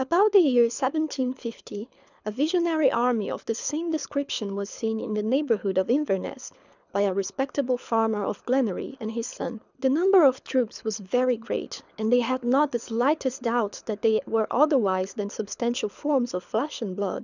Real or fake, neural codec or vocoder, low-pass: fake; codec, 24 kHz, 6 kbps, HILCodec; 7.2 kHz